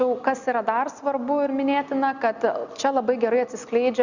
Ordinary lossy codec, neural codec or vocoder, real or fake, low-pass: Opus, 64 kbps; none; real; 7.2 kHz